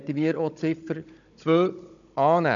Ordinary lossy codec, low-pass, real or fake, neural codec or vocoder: none; 7.2 kHz; fake; codec, 16 kHz, 16 kbps, FunCodec, trained on Chinese and English, 50 frames a second